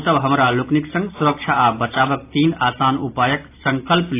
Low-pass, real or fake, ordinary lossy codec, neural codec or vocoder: 3.6 kHz; real; AAC, 24 kbps; none